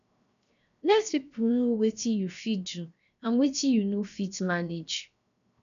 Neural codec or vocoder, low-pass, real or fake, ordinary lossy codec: codec, 16 kHz, 0.7 kbps, FocalCodec; 7.2 kHz; fake; none